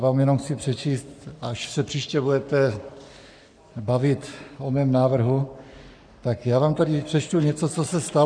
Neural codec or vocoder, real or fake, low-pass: codec, 44.1 kHz, 7.8 kbps, Pupu-Codec; fake; 9.9 kHz